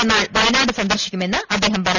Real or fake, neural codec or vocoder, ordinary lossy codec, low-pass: real; none; none; 7.2 kHz